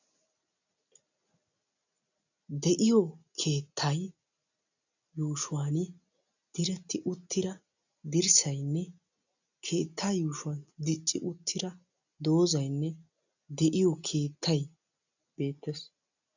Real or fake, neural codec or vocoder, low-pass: real; none; 7.2 kHz